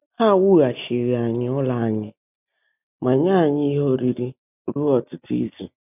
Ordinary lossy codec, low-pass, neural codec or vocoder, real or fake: none; 3.6 kHz; none; real